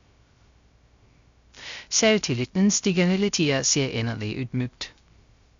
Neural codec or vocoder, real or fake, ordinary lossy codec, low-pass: codec, 16 kHz, 0.3 kbps, FocalCodec; fake; Opus, 64 kbps; 7.2 kHz